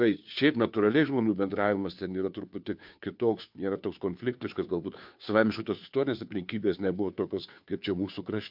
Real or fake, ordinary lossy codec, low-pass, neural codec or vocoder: fake; MP3, 48 kbps; 5.4 kHz; codec, 16 kHz, 2 kbps, FunCodec, trained on Chinese and English, 25 frames a second